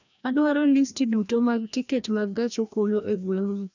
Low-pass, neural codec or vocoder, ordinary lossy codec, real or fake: 7.2 kHz; codec, 16 kHz, 1 kbps, FreqCodec, larger model; none; fake